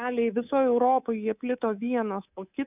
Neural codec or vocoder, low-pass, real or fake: codec, 24 kHz, 3.1 kbps, DualCodec; 3.6 kHz; fake